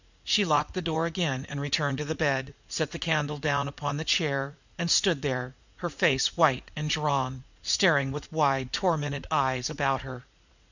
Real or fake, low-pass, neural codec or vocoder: fake; 7.2 kHz; vocoder, 44.1 kHz, 80 mel bands, Vocos